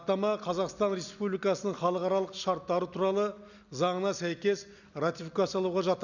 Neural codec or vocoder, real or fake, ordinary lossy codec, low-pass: none; real; none; 7.2 kHz